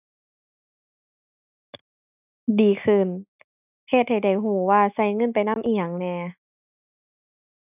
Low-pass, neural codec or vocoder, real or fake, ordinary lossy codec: 3.6 kHz; none; real; none